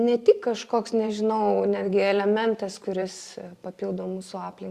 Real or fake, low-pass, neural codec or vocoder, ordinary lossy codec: fake; 14.4 kHz; vocoder, 44.1 kHz, 128 mel bands every 512 samples, BigVGAN v2; Opus, 64 kbps